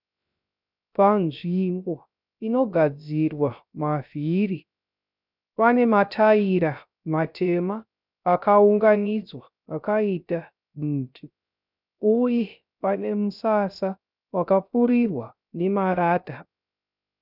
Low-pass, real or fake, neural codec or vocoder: 5.4 kHz; fake; codec, 16 kHz, 0.3 kbps, FocalCodec